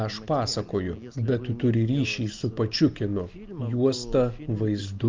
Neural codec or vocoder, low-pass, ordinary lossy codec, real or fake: none; 7.2 kHz; Opus, 24 kbps; real